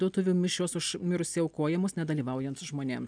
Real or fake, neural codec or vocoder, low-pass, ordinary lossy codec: real; none; 9.9 kHz; MP3, 96 kbps